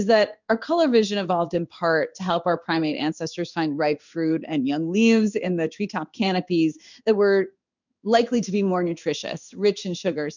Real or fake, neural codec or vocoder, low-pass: fake; codec, 16 kHz in and 24 kHz out, 1 kbps, XY-Tokenizer; 7.2 kHz